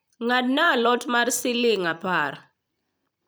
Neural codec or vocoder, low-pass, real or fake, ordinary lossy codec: vocoder, 44.1 kHz, 128 mel bands every 256 samples, BigVGAN v2; none; fake; none